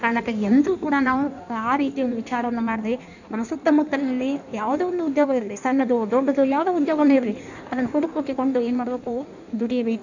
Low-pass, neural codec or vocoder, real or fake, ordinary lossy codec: 7.2 kHz; codec, 16 kHz in and 24 kHz out, 1.1 kbps, FireRedTTS-2 codec; fake; none